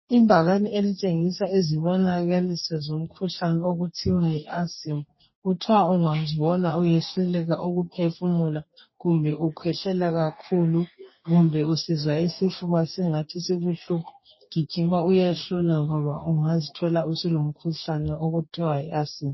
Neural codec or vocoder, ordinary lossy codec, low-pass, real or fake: codec, 44.1 kHz, 2.6 kbps, DAC; MP3, 24 kbps; 7.2 kHz; fake